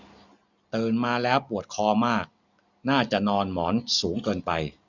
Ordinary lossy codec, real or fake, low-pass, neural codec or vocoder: none; real; 7.2 kHz; none